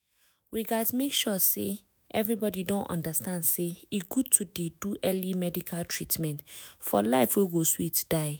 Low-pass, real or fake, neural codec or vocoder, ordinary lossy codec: none; fake; autoencoder, 48 kHz, 128 numbers a frame, DAC-VAE, trained on Japanese speech; none